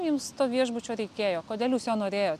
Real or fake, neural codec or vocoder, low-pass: real; none; 14.4 kHz